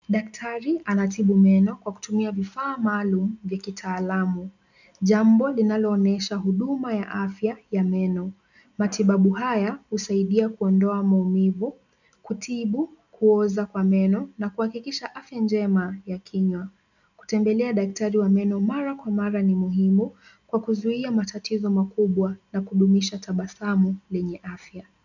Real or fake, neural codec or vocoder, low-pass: real; none; 7.2 kHz